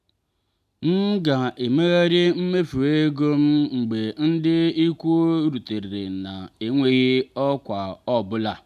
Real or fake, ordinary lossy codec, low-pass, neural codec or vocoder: real; none; 14.4 kHz; none